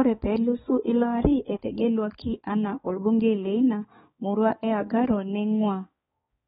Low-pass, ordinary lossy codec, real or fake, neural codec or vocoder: 7.2 kHz; AAC, 16 kbps; fake; codec, 16 kHz, 4 kbps, X-Codec, HuBERT features, trained on balanced general audio